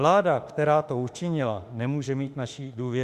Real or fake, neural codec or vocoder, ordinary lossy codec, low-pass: fake; autoencoder, 48 kHz, 32 numbers a frame, DAC-VAE, trained on Japanese speech; Opus, 64 kbps; 14.4 kHz